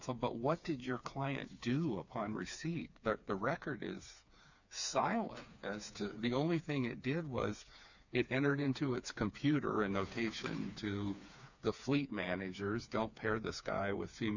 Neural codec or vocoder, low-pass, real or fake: codec, 16 kHz, 4 kbps, FreqCodec, smaller model; 7.2 kHz; fake